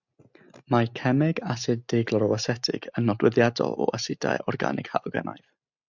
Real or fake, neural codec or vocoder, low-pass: real; none; 7.2 kHz